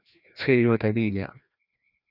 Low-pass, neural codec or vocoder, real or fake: 5.4 kHz; codec, 16 kHz, 1 kbps, FreqCodec, larger model; fake